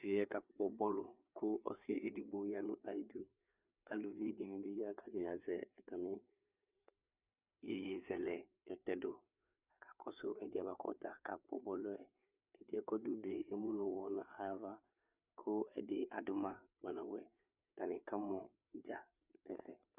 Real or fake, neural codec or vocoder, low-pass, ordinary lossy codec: fake; codec, 16 kHz, 4 kbps, FreqCodec, larger model; 3.6 kHz; Opus, 64 kbps